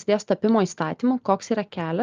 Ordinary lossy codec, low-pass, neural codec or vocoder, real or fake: Opus, 24 kbps; 7.2 kHz; none; real